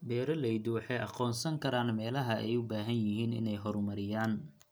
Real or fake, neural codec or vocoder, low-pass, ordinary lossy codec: real; none; none; none